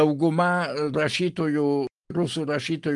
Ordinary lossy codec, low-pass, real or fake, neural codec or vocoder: Opus, 32 kbps; 10.8 kHz; real; none